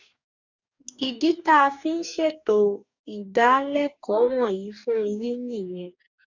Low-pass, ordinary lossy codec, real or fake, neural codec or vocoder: 7.2 kHz; none; fake; codec, 44.1 kHz, 2.6 kbps, DAC